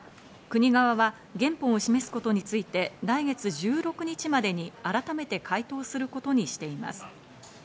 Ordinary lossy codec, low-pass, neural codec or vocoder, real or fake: none; none; none; real